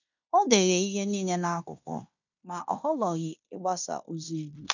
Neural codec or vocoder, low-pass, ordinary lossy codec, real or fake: codec, 16 kHz in and 24 kHz out, 0.9 kbps, LongCat-Audio-Codec, fine tuned four codebook decoder; 7.2 kHz; none; fake